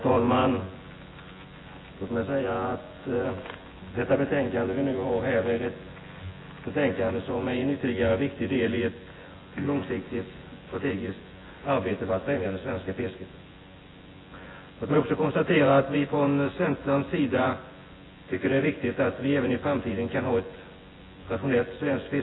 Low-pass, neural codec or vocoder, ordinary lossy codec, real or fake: 7.2 kHz; vocoder, 24 kHz, 100 mel bands, Vocos; AAC, 16 kbps; fake